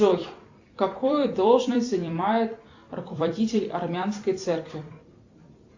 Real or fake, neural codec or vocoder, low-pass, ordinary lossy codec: fake; vocoder, 44.1 kHz, 128 mel bands every 256 samples, BigVGAN v2; 7.2 kHz; AAC, 48 kbps